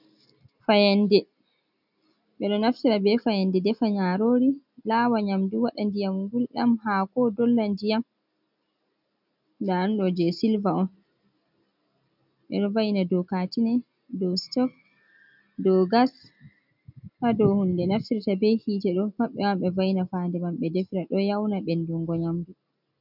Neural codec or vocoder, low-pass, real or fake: none; 5.4 kHz; real